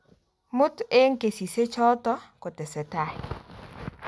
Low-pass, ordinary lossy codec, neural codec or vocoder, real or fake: none; none; none; real